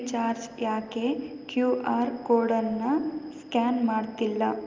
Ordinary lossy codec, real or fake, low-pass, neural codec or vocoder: Opus, 32 kbps; real; 7.2 kHz; none